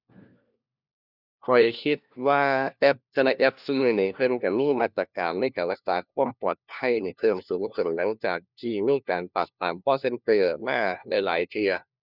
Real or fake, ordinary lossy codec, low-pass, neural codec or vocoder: fake; none; 5.4 kHz; codec, 16 kHz, 1 kbps, FunCodec, trained on LibriTTS, 50 frames a second